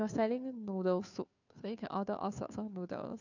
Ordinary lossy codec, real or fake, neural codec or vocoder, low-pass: none; fake; codec, 16 kHz, 2 kbps, FunCodec, trained on Chinese and English, 25 frames a second; 7.2 kHz